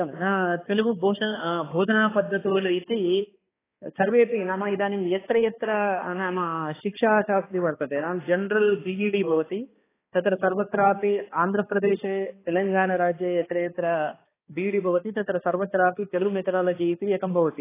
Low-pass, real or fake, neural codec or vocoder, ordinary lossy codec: 3.6 kHz; fake; codec, 16 kHz, 2 kbps, X-Codec, HuBERT features, trained on balanced general audio; AAC, 16 kbps